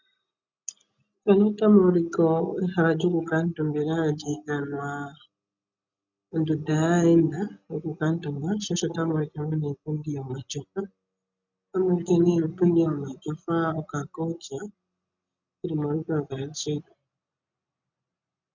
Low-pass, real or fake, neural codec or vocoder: 7.2 kHz; real; none